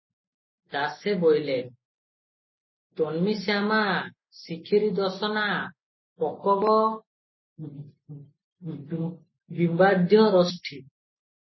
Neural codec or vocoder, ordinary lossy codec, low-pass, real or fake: none; MP3, 24 kbps; 7.2 kHz; real